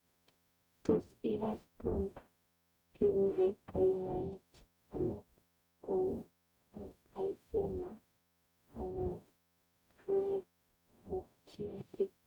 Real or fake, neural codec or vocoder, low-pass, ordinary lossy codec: fake; codec, 44.1 kHz, 0.9 kbps, DAC; 19.8 kHz; none